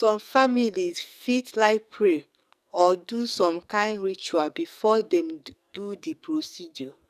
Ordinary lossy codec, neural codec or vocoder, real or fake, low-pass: none; codec, 44.1 kHz, 2.6 kbps, SNAC; fake; 14.4 kHz